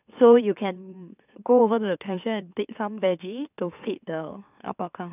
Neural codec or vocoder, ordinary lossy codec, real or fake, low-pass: autoencoder, 44.1 kHz, a latent of 192 numbers a frame, MeloTTS; none; fake; 3.6 kHz